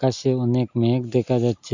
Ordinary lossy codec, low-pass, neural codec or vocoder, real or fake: none; 7.2 kHz; none; real